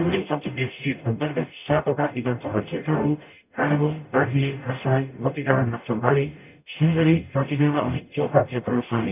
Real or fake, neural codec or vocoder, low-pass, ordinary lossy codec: fake; codec, 44.1 kHz, 0.9 kbps, DAC; 3.6 kHz; none